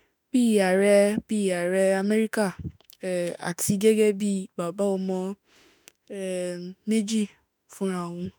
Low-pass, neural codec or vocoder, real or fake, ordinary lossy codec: none; autoencoder, 48 kHz, 32 numbers a frame, DAC-VAE, trained on Japanese speech; fake; none